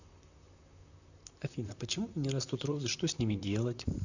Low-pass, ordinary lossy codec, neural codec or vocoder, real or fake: 7.2 kHz; none; vocoder, 44.1 kHz, 128 mel bands, Pupu-Vocoder; fake